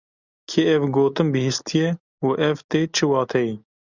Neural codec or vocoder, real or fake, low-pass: none; real; 7.2 kHz